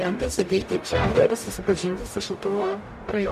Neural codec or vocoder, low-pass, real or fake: codec, 44.1 kHz, 0.9 kbps, DAC; 14.4 kHz; fake